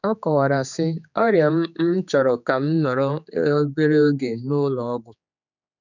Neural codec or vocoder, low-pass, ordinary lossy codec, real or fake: codec, 16 kHz, 4 kbps, X-Codec, HuBERT features, trained on general audio; 7.2 kHz; none; fake